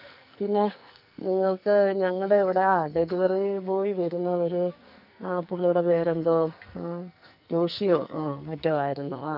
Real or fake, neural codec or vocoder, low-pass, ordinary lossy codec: fake; codec, 44.1 kHz, 2.6 kbps, SNAC; 5.4 kHz; none